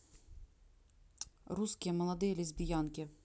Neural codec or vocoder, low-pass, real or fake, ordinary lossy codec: none; none; real; none